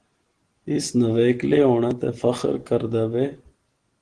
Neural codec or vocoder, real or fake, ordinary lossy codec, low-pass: none; real; Opus, 16 kbps; 9.9 kHz